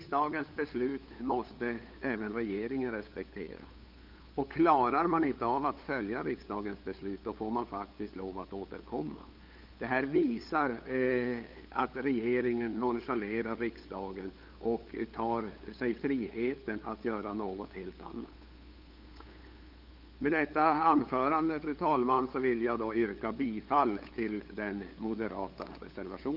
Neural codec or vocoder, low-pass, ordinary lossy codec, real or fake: codec, 16 kHz, 8 kbps, FunCodec, trained on LibriTTS, 25 frames a second; 5.4 kHz; Opus, 24 kbps; fake